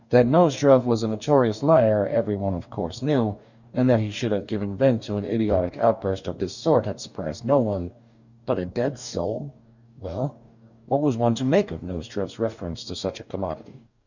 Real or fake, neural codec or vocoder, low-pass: fake; codec, 44.1 kHz, 2.6 kbps, DAC; 7.2 kHz